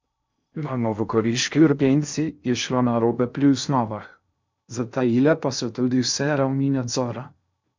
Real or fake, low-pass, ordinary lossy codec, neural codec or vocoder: fake; 7.2 kHz; MP3, 64 kbps; codec, 16 kHz in and 24 kHz out, 0.6 kbps, FocalCodec, streaming, 4096 codes